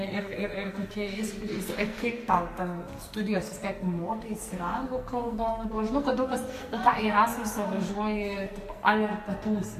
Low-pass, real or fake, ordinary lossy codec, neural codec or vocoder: 14.4 kHz; fake; MP3, 64 kbps; codec, 44.1 kHz, 2.6 kbps, SNAC